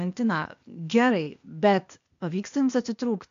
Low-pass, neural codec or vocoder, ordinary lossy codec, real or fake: 7.2 kHz; codec, 16 kHz, 0.8 kbps, ZipCodec; MP3, 64 kbps; fake